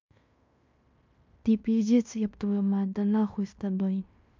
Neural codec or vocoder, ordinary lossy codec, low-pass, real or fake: codec, 16 kHz in and 24 kHz out, 0.9 kbps, LongCat-Audio-Codec, fine tuned four codebook decoder; MP3, 64 kbps; 7.2 kHz; fake